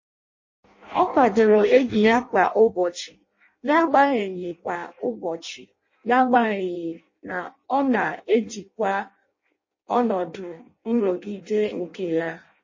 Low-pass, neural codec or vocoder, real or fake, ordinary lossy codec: 7.2 kHz; codec, 16 kHz in and 24 kHz out, 0.6 kbps, FireRedTTS-2 codec; fake; MP3, 32 kbps